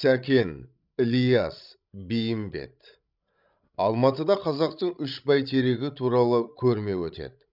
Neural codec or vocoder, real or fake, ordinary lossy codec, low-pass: codec, 16 kHz, 16 kbps, FreqCodec, larger model; fake; none; 5.4 kHz